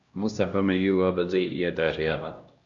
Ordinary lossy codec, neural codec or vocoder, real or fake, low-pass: Opus, 64 kbps; codec, 16 kHz, 1 kbps, X-Codec, HuBERT features, trained on LibriSpeech; fake; 7.2 kHz